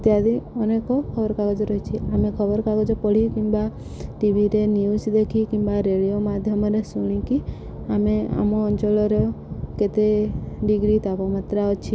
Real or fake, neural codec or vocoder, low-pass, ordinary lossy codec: real; none; none; none